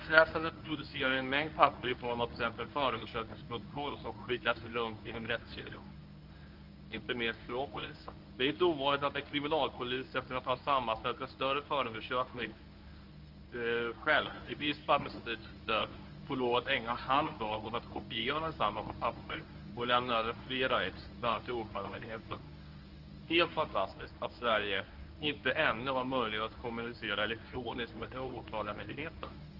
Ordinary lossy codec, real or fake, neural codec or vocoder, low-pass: Opus, 24 kbps; fake; codec, 24 kHz, 0.9 kbps, WavTokenizer, medium speech release version 1; 5.4 kHz